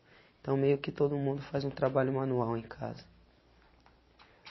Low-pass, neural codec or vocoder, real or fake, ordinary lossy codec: 7.2 kHz; none; real; MP3, 24 kbps